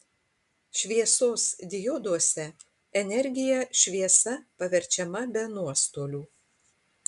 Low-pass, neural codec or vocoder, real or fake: 10.8 kHz; none; real